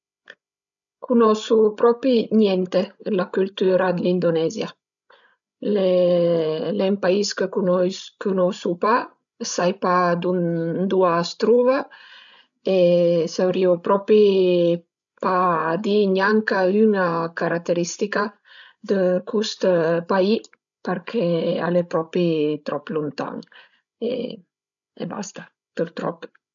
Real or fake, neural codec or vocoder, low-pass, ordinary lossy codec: fake; codec, 16 kHz, 16 kbps, FreqCodec, larger model; 7.2 kHz; none